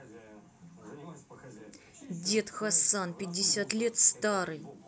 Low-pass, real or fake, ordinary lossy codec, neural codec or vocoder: none; real; none; none